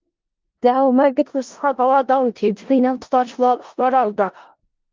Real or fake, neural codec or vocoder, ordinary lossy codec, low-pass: fake; codec, 16 kHz in and 24 kHz out, 0.4 kbps, LongCat-Audio-Codec, four codebook decoder; Opus, 32 kbps; 7.2 kHz